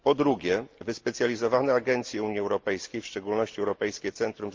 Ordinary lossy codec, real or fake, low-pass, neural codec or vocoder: Opus, 24 kbps; real; 7.2 kHz; none